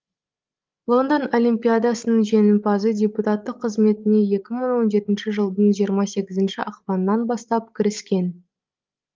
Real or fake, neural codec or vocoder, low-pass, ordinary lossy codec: fake; codec, 16 kHz, 16 kbps, FreqCodec, larger model; 7.2 kHz; Opus, 32 kbps